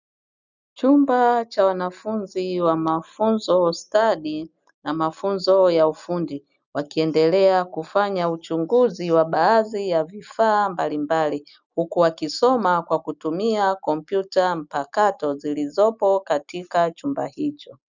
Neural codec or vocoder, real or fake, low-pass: none; real; 7.2 kHz